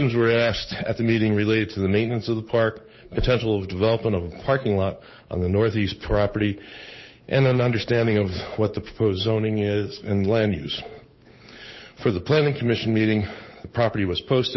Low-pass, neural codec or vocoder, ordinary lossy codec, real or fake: 7.2 kHz; codec, 16 kHz, 8 kbps, FunCodec, trained on Chinese and English, 25 frames a second; MP3, 24 kbps; fake